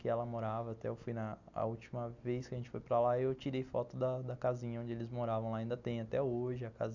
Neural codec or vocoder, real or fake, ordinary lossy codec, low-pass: none; real; none; 7.2 kHz